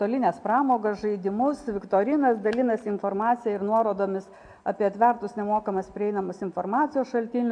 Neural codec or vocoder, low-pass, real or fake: none; 9.9 kHz; real